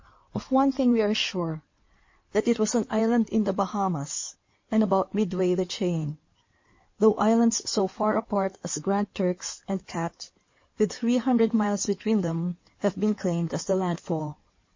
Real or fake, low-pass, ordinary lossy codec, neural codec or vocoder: fake; 7.2 kHz; MP3, 32 kbps; codec, 16 kHz in and 24 kHz out, 2.2 kbps, FireRedTTS-2 codec